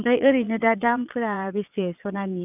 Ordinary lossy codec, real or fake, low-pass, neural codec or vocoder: none; fake; 3.6 kHz; vocoder, 22.05 kHz, 80 mel bands, Vocos